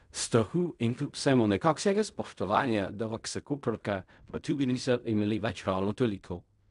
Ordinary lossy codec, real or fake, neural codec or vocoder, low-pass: none; fake; codec, 16 kHz in and 24 kHz out, 0.4 kbps, LongCat-Audio-Codec, fine tuned four codebook decoder; 10.8 kHz